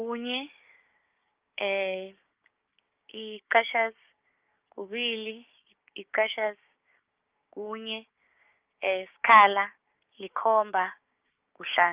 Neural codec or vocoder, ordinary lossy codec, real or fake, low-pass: codec, 16 kHz, 6 kbps, DAC; Opus, 24 kbps; fake; 3.6 kHz